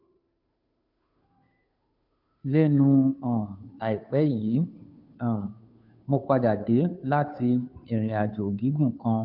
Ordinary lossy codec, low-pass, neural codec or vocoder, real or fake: MP3, 48 kbps; 5.4 kHz; codec, 16 kHz, 2 kbps, FunCodec, trained on Chinese and English, 25 frames a second; fake